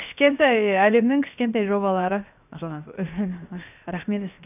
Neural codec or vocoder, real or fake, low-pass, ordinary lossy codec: codec, 16 kHz, 0.7 kbps, FocalCodec; fake; 3.6 kHz; none